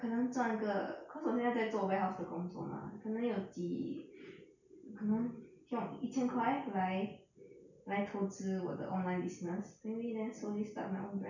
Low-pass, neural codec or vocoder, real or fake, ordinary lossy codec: 7.2 kHz; none; real; none